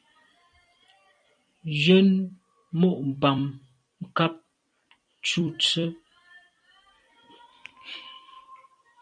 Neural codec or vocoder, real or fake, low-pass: vocoder, 44.1 kHz, 128 mel bands every 256 samples, BigVGAN v2; fake; 9.9 kHz